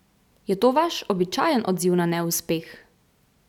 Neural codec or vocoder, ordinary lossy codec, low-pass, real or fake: none; none; 19.8 kHz; real